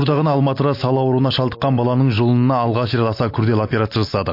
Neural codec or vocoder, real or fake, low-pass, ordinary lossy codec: none; real; 5.4 kHz; MP3, 32 kbps